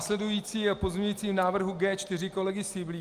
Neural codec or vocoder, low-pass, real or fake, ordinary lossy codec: none; 14.4 kHz; real; Opus, 32 kbps